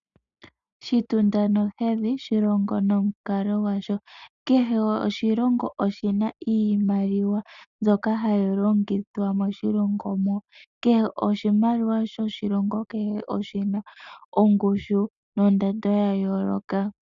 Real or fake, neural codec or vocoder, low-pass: real; none; 7.2 kHz